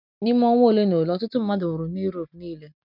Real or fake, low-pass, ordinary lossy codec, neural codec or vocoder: real; 5.4 kHz; none; none